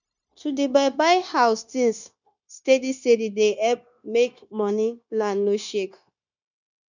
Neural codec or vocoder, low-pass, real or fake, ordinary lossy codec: codec, 16 kHz, 0.9 kbps, LongCat-Audio-Codec; 7.2 kHz; fake; none